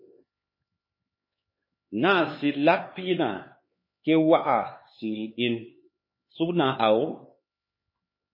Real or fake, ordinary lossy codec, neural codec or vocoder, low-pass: fake; MP3, 24 kbps; codec, 16 kHz, 4 kbps, X-Codec, HuBERT features, trained on LibriSpeech; 5.4 kHz